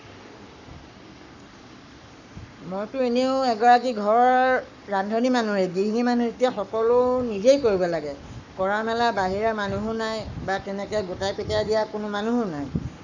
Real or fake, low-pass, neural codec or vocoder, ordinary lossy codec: fake; 7.2 kHz; codec, 44.1 kHz, 7.8 kbps, Pupu-Codec; none